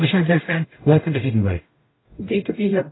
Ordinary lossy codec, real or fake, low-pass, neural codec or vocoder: AAC, 16 kbps; fake; 7.2 kHz; codec, 44.1 kHz, 0.9 kbps, DAC